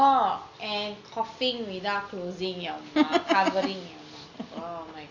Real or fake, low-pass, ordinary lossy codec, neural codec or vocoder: real; 7.2 kHz; none; none